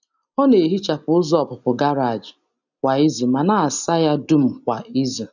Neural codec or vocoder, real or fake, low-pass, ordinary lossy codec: none; real; 7.2 kHz; none